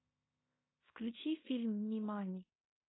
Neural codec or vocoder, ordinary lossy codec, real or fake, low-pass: codec, 16 kHz in and 24 kHz out, 0.9 kbps, LongCat-Audio-Codec, fine tuned four codebook decoder; AAC, 16 kbps; fake; 7.2 kHz